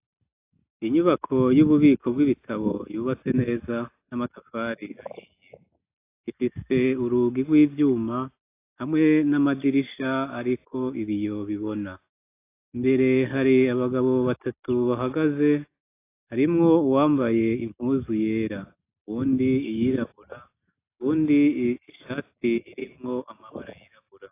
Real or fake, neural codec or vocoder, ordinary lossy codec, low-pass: real; none; AAC, 24 kbps; 3.6 kHz